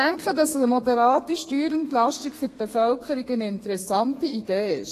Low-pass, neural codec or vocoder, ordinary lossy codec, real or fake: 14.4 kHz; codec, 32 kHz, 1.9 kbps, SNAC; AAC, 48 kbps; fake